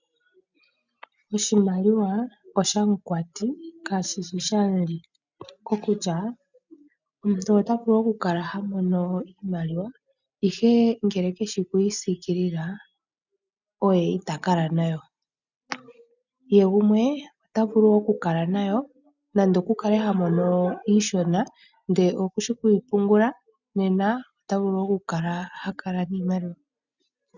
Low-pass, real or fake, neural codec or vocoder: 7.2 kHz; real; none